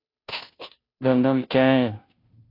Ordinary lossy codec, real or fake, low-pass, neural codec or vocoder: AAC, 32 kbps; fake; 5.4 kHz; codec, 16 kHz, 0.5 kbps, FunCodec, trained on Chinese and English, 25 frames a second